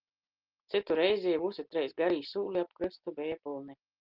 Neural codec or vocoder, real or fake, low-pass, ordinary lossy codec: none; real; 5.4 kHz; Opus, 32 kbps